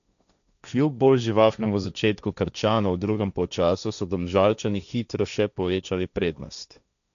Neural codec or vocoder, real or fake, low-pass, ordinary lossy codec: codec, 16 kHz, 1.1 kbps, Voila-Tokenizer; fake; 7.2 kHz; none